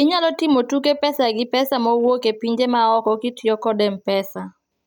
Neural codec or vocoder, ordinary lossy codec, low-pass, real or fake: none; none; none; real